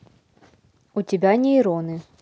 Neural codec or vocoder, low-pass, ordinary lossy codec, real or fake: none; none; none; real